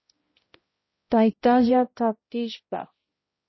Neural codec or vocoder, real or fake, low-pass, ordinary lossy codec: codec, 16 kHz, 0.5 kbps, X-Codec, HuBERT features, trained on balanced general audio; fake; 7.2 kHz; MP3, 24 kbps